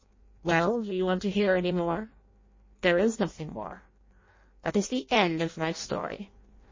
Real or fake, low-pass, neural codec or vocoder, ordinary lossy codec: fake; 7.2 kHz; codec, 16 kHz in and 24 kHz out, 0.6 kbps, FireRedTTS-2 codec; MP3, 32 kbps